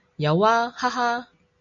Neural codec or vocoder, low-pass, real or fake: none; 7.2 kHz; real